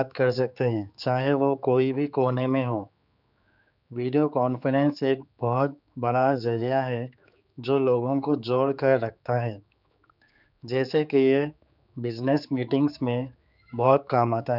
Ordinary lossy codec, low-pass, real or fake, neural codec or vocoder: none; 5.4 kHz; fake; codec, 16 kHz, 4 kbps, X-Codec, HuBERT features, trained on general audio